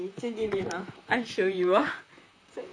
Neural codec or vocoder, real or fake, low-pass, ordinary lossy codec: vocoder, 44.1 kHz, 128 mel bands, Pupu-Vocoder; fake; 9.9 kHz; none